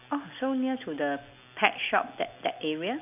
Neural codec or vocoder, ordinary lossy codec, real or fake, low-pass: none; none; real; 3.6 kHz